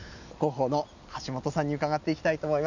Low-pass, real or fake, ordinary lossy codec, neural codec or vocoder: 7.2 kHz; real; none; none